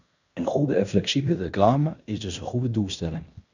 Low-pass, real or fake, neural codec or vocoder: 7.2 kHz; fake; codec, 16 kHz in and 24 kHz out, 0.9 kbps, LongCat-Audio-Codec, fine tuned four codebook decoder